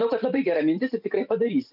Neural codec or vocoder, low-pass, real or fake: vocoder, 44.1 kHz, 128 mel bands every 256 samples, BigVGAN v2; 5.4 kHz; fake